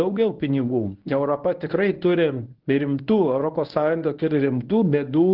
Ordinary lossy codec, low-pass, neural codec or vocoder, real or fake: Opus, 16 kbps; 5.4 kHz; codec, 24 kHz, 0.9 kbps, WavTokenizer, medium speech release version 1; fake